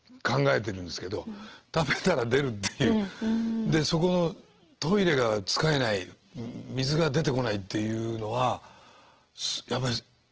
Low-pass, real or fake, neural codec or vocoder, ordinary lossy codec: 7.2 kHz; real; none; Opus, 16 kbps